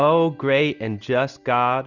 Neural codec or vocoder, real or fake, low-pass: none; real; 7.2 kHz